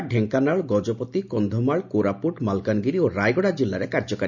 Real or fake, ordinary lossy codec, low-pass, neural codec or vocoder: real; none; 7.2 kHz; none